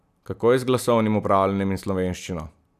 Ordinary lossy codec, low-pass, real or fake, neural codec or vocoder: none; 14.4 kHz; real; none